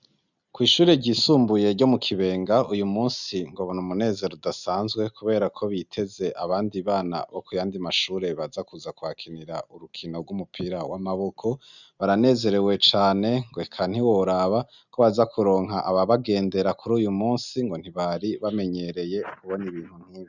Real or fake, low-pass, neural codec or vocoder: real; 7.2 kHz; none